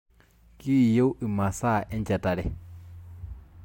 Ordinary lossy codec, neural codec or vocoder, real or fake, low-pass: MP3, 64 kbps; none; real; 19.8 kHz